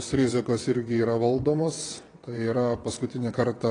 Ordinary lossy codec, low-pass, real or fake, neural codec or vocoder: AAC, 32 kbps; 9.9 kHz; fake; vocoder, 22.05 kHz, 80 mel bands, WaveNeXt